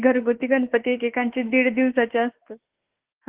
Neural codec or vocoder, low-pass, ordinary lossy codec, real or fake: autoencoder, 48 kHz, 32 numbers a frame, DAC-VAE, trained on Japanese speech; 3.6 kHz; Opus, 16 kbps; fake